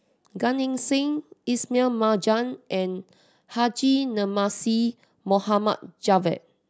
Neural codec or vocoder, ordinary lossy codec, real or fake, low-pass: none; none; real; none